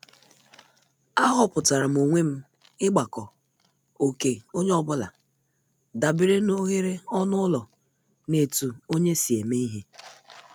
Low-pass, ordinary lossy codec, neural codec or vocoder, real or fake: none; none; none; real